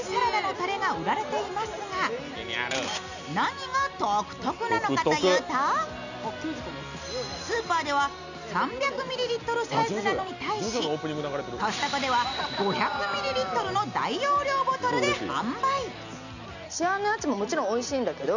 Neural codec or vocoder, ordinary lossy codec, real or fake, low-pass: none; none; real; 7.2 kHz